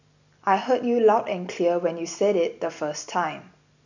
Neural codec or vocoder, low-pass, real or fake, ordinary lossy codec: none; 7.2 kHz; real; none